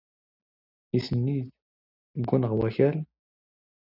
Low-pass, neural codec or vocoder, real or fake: 5.4 kHz; none; real